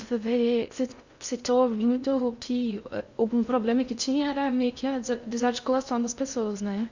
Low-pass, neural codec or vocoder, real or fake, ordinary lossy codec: 7.2 kHz; codec, 16 kHz in and 24 kHz out, 0.6 kbps, FocalCodec, streaming, 2048 codes; fake; Opus, 64 kbps